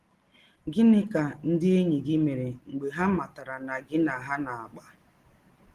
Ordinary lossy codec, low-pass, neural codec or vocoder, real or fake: Opus, 16 kbps; 14.4 kHz; none; real